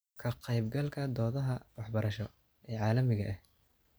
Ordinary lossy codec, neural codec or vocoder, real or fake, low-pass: none; none; real; none